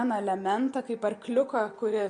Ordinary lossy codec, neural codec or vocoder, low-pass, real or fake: MP3, 48 kbps; none; 9.9 kHz; real